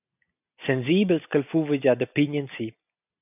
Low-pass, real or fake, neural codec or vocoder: 3.6 kHz; real; none